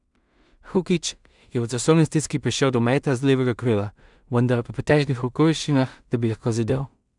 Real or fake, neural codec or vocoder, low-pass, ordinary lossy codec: fake; codec, 16 kHz in and 24 kHz out, 0.4 kbps, LongCat-Audio-Codec, two codebook decoder; 10.8 kHz; none